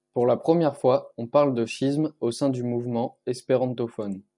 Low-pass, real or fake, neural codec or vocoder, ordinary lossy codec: 10.8 kHz; real; none; MP3, 96 kbps